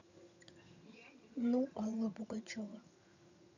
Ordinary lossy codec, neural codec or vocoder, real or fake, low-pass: none; vocoder, 22.05 kHz, 80 mel bands, HiFi-GAN; fake; 7.2 kHz